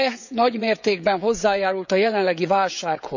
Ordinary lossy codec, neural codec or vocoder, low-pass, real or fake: none; codec, 16 kHz, 16 kbps, FreqCodec, smaller model; 7.2 kHz; fake